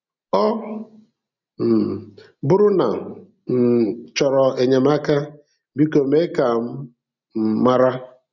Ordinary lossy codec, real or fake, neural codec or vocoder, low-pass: none; real; none; 7.2 kHz